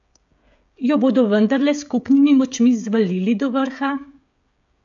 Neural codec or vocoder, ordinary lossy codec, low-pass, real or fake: codec, 16 kHz, 16 kbps, FreqCodec, smaller model; AAC, 64 kbps; 7.2 kHz; fake